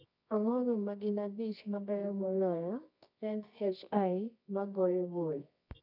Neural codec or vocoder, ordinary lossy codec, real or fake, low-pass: codec, 24 kHz, 0.9 kbps, WavTokenizer, medium music audio release; none; fake; 5.4 kHz